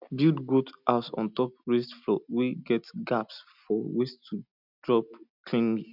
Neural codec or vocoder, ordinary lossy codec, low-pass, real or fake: none; none; 5.4 kHz; real